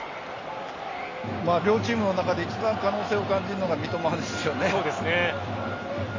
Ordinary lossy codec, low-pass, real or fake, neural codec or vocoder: AAC, 32 kbps; 7.2 kHz; real; none